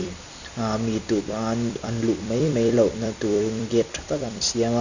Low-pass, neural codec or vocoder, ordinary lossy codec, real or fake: 7.2 kHz; vocoder, 44.1 kHz, 128 mel bands every 256 samples, BigVGAN v2; none; fake